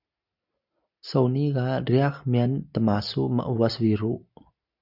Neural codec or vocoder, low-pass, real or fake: none; 5.4 kHz; real